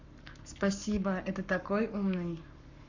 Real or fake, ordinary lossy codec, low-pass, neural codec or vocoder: fake; none; 7.2 kHz; codec, 44.1 kHz, 7.8 kbps, Pupu-Codec